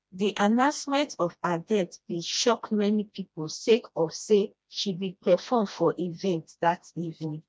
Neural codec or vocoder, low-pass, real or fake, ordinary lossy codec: codec, 16 kHz, 1 kbps, FreqCodec, smaller model; none; fake; none